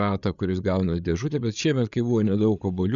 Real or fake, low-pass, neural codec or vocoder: fake; 7.2 kHz; codec, 16 kHz, 8 kbps, FreqCodec, larger model